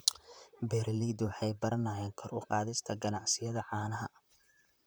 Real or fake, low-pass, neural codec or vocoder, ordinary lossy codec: fake; none; vocoder, 44.1 kHz, 128 mel bands, Pupu-Vocoder; none